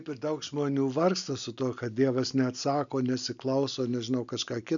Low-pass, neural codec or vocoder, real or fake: 7.2 kHz; none; real